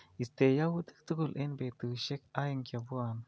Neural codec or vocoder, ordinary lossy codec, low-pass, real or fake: none; none; none; real